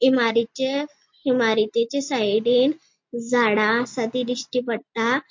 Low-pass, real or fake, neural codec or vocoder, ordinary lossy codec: 7.2 kHz; real; none; MP3, 48 kbps